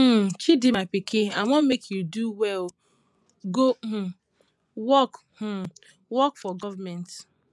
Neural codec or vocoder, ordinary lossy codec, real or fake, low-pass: vocoder, 24 kHz, 100 mel bands, Vocos; none; fake; none